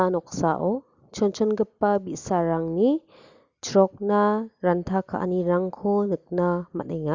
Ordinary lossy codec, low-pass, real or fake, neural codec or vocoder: Opus, 64 kbps; 7.2 kHz; real; none